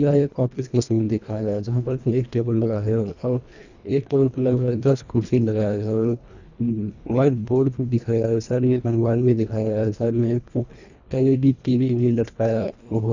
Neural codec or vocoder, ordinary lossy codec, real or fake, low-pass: codec, 24 kHz, 1.5 kbps, HILCodec; none; fake; 7.2 kHz